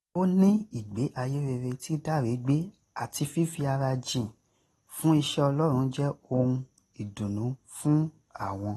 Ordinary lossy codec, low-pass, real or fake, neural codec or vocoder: AAC, 32 kbps; 19.8 kHz; real; none